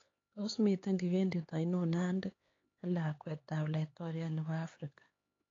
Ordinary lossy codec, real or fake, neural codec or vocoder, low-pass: AAC, 32 kbps; fake; codec, 16 kHz, 4 kbps, X-Codec, HuBERT features, trained on LibriSpeech; 7.2 kHz